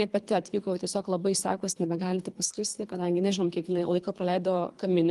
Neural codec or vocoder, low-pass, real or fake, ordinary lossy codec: codec, 24 kHz, 3 kbps, HILCodec; 10.8 kHz; fake; Opus, 24 kbps